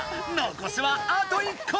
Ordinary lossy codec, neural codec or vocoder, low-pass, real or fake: none; none; none; real